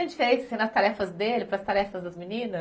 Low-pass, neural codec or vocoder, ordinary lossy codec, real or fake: none; none; none; real